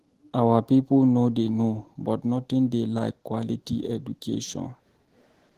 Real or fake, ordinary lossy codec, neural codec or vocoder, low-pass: fake; Opus, 16 kbps; autoencoder, 48 kHz, 128 numbers a frame, DAC-VAE, trained on Japanese speech; 14.4 kHz